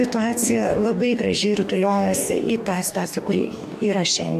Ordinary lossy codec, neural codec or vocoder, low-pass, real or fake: AAC, 96 kbps; codec, 44.1 kHz, 2.6 kbps, DAC; 14.4 kHz; fake